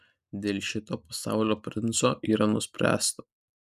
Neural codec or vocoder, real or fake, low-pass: none; real; 14.4 kHz